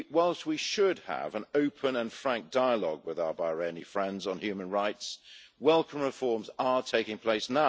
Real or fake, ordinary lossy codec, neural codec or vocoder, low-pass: real; none; none; none